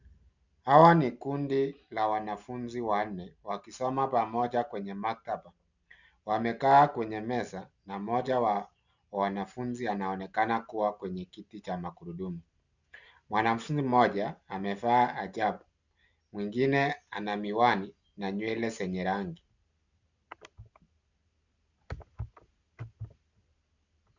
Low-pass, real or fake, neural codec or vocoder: 7.2 kHz; real; none